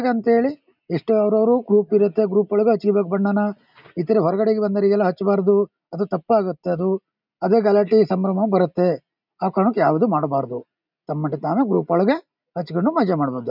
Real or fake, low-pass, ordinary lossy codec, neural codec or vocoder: real; 5.4 kHz; none; none